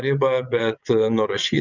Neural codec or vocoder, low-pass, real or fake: vocoder, 22.05 kHz, 80 mel bands, WaveNeXt; 7.2 kHz; fake